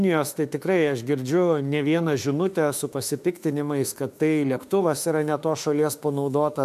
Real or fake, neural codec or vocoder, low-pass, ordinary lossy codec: fake; autoencoder, 48 kHz, 32 numbers a frame, DAC-VAE, trained on Japanese speech; 14.4 kHz; AAC, 96 kbps